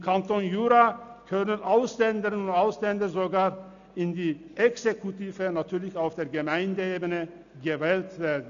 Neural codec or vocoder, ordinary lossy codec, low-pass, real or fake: none; none; 7.2 kHz; real